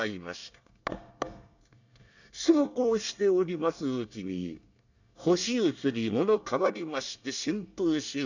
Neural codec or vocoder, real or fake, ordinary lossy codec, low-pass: codec, 24 kHz, 1 kbps, SNAC; fake; none; 7.2 kHz